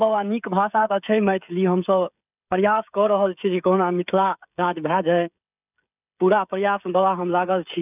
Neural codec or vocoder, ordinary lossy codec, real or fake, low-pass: codec, 16 kHz, 16 kbps, FreqCodec, smaller model; none; fake; 3.6 kHz